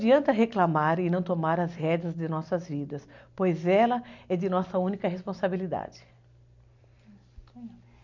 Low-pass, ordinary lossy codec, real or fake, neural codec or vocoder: 7.2 kHz; none; real; none